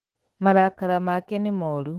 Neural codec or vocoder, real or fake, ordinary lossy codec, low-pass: autoencoder, 48 kHz, 32 numbers a frame, DAC-VAE, trained on Japanese speech; fake; Opus, 16 kbps; 14.4 kHz